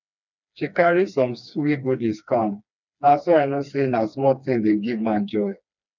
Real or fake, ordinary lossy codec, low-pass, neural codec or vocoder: fake; none; 7.2 kHz; codec, 16 kHz, 2 kbps, FreqCodec, smaller model